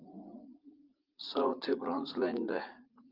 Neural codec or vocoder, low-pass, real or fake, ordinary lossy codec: vocoder, 44.1 kHz, 80 mel bands, Vocos; 5.4 kHz; fake; Opus, 24 kbps